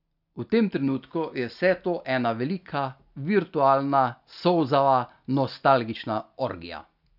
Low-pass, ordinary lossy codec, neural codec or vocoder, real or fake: 5.4 kHz; none; none; real